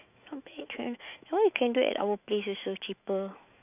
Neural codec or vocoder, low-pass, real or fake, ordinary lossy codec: autoencoder, 48 kHz, 128 numbers a frame, DAC-VAE, trained on Japanese speech; 3.6 kHz; fake; none